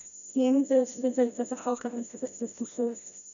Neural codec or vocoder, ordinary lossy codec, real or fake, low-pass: codec, 16 kHz, 1 kbps, FreqCodec, smaller model; none; fake; 7.2 kHz